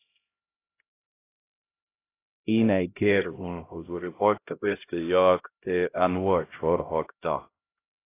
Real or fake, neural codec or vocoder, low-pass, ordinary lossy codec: fake; codec, 16 kHz, 0.5 kbps, X-Codec, HuBERT features, trained on LibriSpeech; 3.6 kHz; AAC, 24 kbps